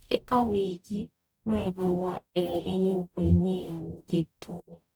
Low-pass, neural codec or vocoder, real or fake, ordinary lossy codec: none; codec, 44.1 kHz, 0.9 kbps, DAC; fake; none